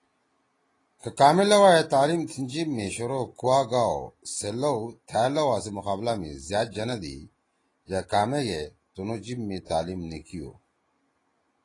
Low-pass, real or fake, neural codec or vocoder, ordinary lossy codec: 10.8 kHz; real; none; AAC, 32 kbps